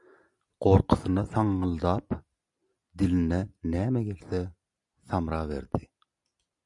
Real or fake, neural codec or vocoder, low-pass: real; none; 10.8 kHz